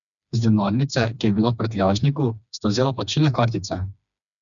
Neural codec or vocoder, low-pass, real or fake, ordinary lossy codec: codec, 16 kHz, 2 kbps, FreqCodec, smaller model; 7.2 kHz; fake; none